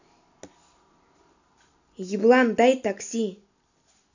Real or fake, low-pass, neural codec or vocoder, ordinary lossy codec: real; 7.2 kHz; none; none